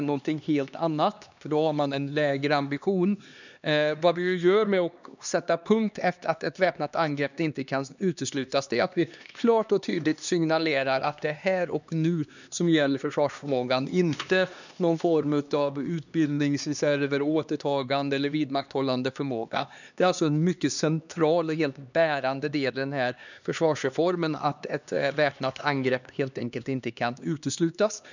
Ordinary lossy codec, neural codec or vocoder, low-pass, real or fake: none; codec, 16 kHz, 2 kbps, X-Codec, HuBERT features, trained on LibriSpeech; 7.2 kHz; fake